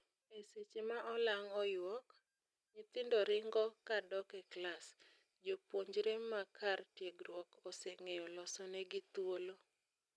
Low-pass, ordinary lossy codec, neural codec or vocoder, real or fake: none; none; none; real